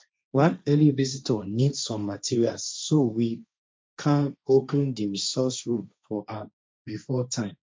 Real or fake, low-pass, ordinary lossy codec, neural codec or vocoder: fake; none; none; codec, 16 kHz, 1.1 kbps, Voila-Tokenizer